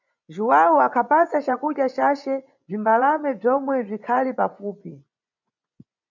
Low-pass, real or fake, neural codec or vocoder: 7.2 kHz; fake; vocoder, 24 kHz, 100 mel bands, Vocos